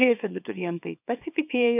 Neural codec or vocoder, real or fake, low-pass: codec, 24 kHz, 0.9 kbps, WavTokenizer, small release; fake; 3.6 kHz